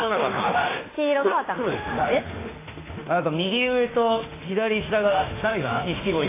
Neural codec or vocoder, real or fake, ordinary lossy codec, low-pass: autoencoder, 48 kHz, 32 numbers a frame, DAC-VAE, trained on Japanese speech; fake; AAC, 16 kbps; 3.6 kHz